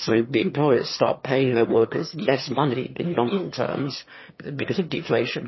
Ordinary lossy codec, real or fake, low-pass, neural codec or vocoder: MP3, 24 kbps; fake; 7.2 kHz; autoencoder, 22.05 kHz, a latent of 192 numbers a frame, VITS, trained on one speaker